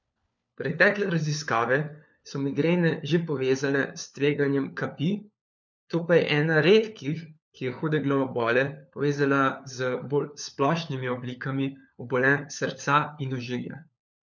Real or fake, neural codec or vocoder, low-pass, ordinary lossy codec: fake; codec, 16 kHz, 4 kbps, FunCodec, trained on LibriTTS, 50 frames a second; 7.2 kHz; none